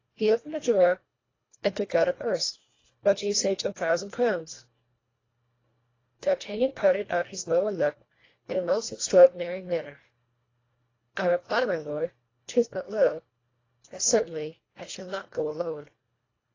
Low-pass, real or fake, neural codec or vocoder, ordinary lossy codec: 7.2 kHz; fake; codec, 24 kHz, 1.5 kbps, HILCodec; AAC, 32 kbps